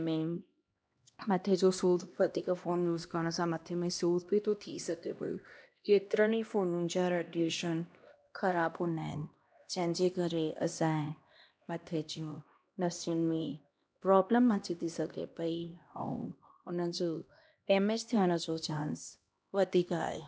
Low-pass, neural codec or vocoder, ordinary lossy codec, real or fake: none; codec, 16 kHz, 1 kbps, X-Codec, HuBERT features, trained on LibriSpeech; none; fake